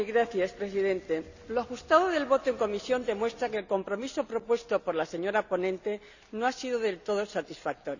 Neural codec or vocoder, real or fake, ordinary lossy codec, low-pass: none; real; none; 7.2 kHz